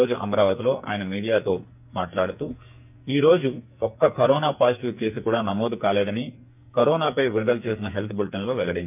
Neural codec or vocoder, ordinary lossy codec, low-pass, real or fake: codec, 44.1 kHz, 2.6 kbps, SNAC; none; 3.6 kHz; fake